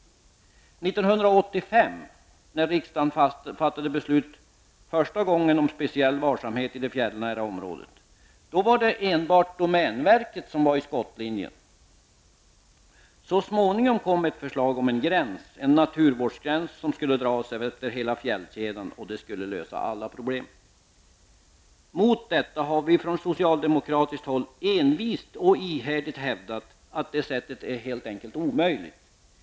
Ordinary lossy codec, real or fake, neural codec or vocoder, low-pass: none; real; none; none